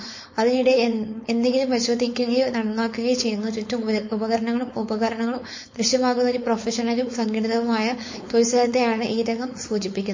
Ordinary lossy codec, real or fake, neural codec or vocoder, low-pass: MP3, 32 kbps; fake; codec, 16 kHz, 4.8 kbps, FACodec; 7.2 kHz